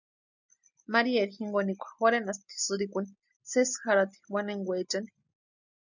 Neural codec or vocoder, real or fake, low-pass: none; real; 7.2 kHz